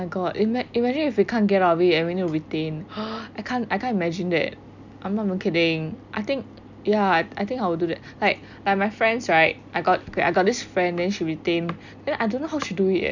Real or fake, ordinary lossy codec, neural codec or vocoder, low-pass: real; none; none; 7.2 kHz